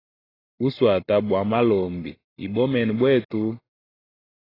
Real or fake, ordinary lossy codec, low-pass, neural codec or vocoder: real; AAC, 24 kbps; 5.4 kHz; none